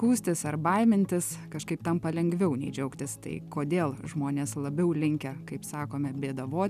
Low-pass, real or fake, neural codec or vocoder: 14.4 kHz; real; none